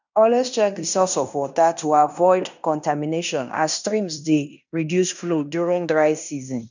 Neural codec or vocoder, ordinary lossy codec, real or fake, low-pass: codec, 16 kHz in and 24 kHz out, 0.9 kbps, LongCat-Audio-Codec, fine tuned four codebook decoder; none; fake; 7.2 kHz